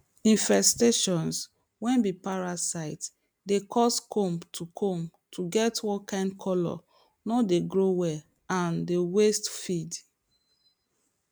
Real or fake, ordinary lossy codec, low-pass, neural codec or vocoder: real; none; none; none